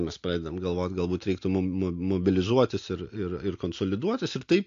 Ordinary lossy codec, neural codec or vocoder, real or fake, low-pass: AAC, 48 kbps; none; real; 7.2 kHz